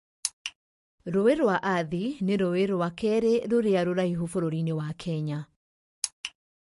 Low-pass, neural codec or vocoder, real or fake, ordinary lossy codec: 14.4 kHz; none; real; MP3, 48 kbps